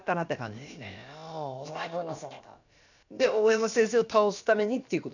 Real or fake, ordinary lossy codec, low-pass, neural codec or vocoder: fake; none; 7.2 kHz; codec, 16 kHz, about 1 kbps, DyCAST, with the encoder's durations